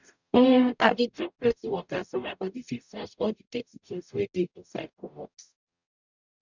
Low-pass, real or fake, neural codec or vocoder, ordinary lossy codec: 7.2 kHz; fake; codec, 44.1 kHz, 0.9 kbps, DAC; none